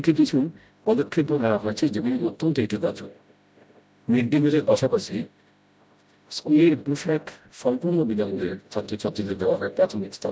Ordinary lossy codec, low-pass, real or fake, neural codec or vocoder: none; none; fake; codec, 16 kHz, 0.5 kbps, FreqCodec, smaller model